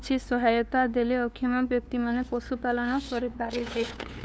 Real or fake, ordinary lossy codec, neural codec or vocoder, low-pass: fake; none; codec, 16 kHz, 2 kbps, FunCodec, trained on LibriTTS, 25 frames a second; none